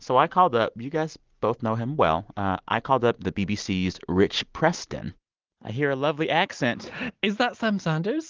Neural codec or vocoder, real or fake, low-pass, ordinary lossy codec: none; real; 7.2 kHz; Opus, 32 kbps